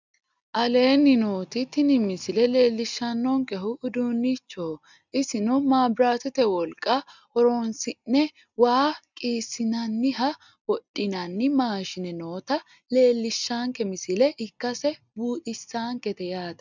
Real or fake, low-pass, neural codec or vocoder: real; 7.2 kHz; none